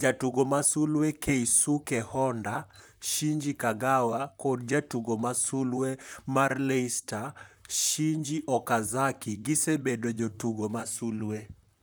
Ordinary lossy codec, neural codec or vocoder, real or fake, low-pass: none; vocoder, 44.1 kHz, 128 mel bands, Pupu-Vocoder; fake; none